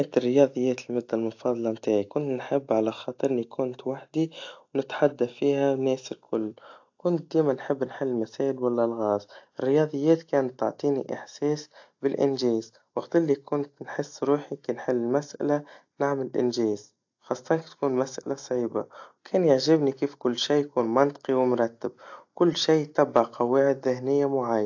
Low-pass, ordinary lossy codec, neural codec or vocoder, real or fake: 7.2 kHz; AAC, 48 kbps; none; real